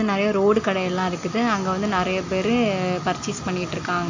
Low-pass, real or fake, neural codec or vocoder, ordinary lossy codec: 7.2 kHz; real; none; AAC, 48 kbps